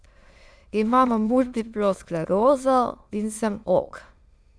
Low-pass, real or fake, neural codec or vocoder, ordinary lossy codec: none; fake; autoencoder, 22.05 kHz, a latent of 192 numbers a frame, VITS, trained on many speakers; none